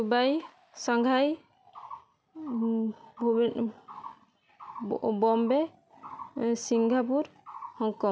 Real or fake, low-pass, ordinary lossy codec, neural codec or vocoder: real; none; none; none